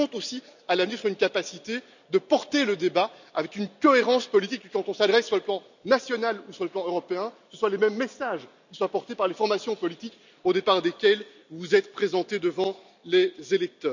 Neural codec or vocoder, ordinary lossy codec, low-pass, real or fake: none; none; 7.2 kHz; real